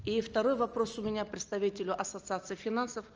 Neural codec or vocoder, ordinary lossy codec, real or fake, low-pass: none; Opus, 24 kbps; real; 7.2 kHz